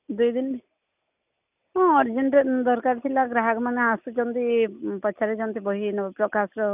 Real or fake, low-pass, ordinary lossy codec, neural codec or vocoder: real; 3.6 kHz; none; none